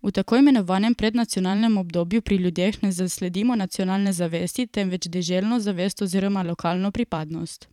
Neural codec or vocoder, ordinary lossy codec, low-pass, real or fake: vocoder, 44.1 kHz, 128 mel bands every 512 samples, BigVGAN v2; none; 19.8 kHz; fake